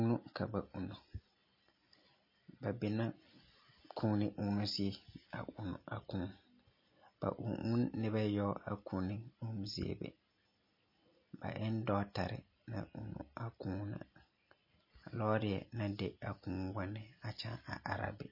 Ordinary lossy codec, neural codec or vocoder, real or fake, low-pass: MP3, 24 kbps; none; real; 5.4 kHz